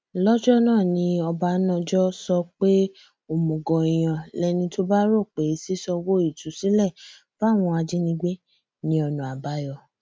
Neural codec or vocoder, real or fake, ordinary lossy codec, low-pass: none; real; none; none